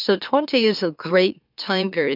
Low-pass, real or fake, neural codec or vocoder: 5.4 kHz; fake; autoencoder, 44.1 kHz, a latent of 192 numbers a frame, MeloTTS